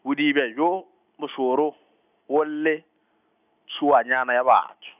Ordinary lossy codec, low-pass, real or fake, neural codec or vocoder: none; 3.6 kHz; real; none